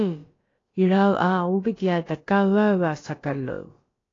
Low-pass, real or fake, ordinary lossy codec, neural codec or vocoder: 7.2 kHz; fake; AAC, 32 kbps; codec, 16 kHz, about 1 kbps, DyCAST, with the encoder's durations